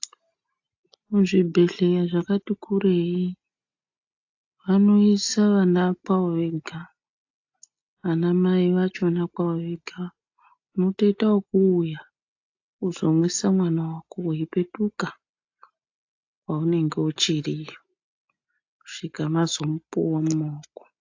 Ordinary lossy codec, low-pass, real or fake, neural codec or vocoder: AAC, 48 kbps; 7.2 kHz; real; none